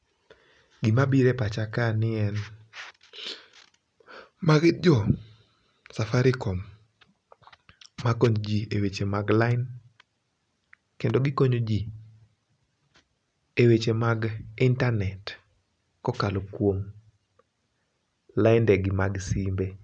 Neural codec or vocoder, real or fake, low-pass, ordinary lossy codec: none; real; 9.9 kHz; none